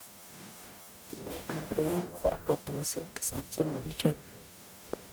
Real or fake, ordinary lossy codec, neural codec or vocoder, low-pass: fake; none; codec, 44.1 kHz, 0.9 kbps, DAC; none